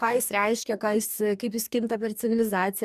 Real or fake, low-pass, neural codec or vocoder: fake; 14.4 kHz; codec, 44.1 kHz, 2.6 kbps, DAC